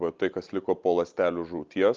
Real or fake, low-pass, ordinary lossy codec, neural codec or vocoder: real; 7.2 kHz; Opus, 24 kbps; none